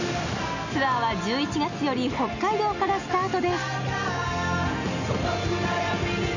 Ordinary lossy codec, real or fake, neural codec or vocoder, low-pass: none; real; none; 7.2 kHz